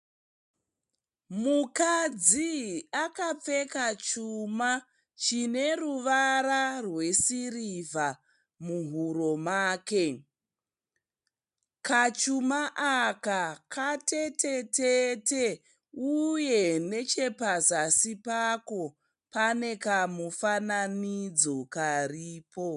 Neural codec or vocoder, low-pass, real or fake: none; 10.8 kHz; real